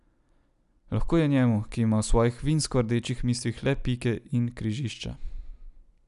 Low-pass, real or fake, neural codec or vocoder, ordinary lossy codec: 10.8 kHz; real; none; none